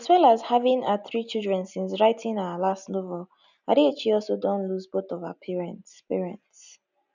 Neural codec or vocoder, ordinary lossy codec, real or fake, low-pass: none; none; real; 7.2 kHz